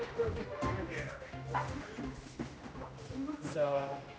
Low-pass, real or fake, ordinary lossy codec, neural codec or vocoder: none; fake; none; codec, 16 kHz, 1 kbps, X-Codec, HuBERT features, trained on general audio